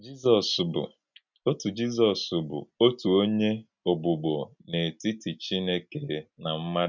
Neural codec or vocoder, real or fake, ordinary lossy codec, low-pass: none; real; none; 7.2 kHz